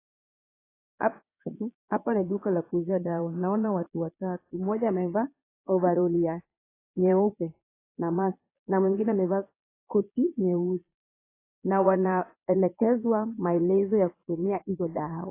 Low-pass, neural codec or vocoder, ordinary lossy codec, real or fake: 3.6 kHz; vocoder, 22.05 kHz, 80 mel bands, Vocos; AAC, 24 kbps; fake